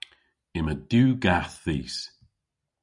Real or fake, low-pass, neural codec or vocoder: real; 10.8 kHz; none